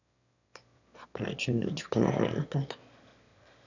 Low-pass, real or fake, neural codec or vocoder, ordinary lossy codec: 7.2 kHz; fake; autoencoder, 22.05 kHz, a latent of 192 numbers a frame, VITS, trained on one speaker; none